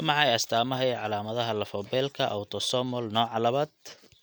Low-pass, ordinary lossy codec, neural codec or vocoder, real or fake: none; none; none; real